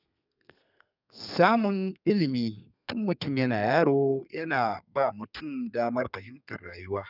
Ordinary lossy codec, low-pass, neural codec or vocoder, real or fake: none; 5.4 kHz; codec, 32 kHz, 1.9 kbps, SNAC; fake